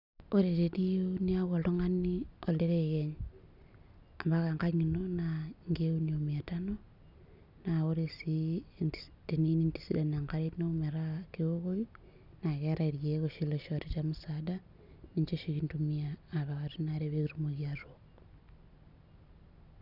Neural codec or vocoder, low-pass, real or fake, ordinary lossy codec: none; 5.4 kHz; real; none